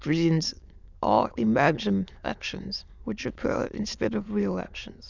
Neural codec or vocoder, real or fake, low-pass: autoencoder, 22.05 kHz, a latent of 192 numbers a frame, VITS, trained on many speakers; fake; 7.2 kHz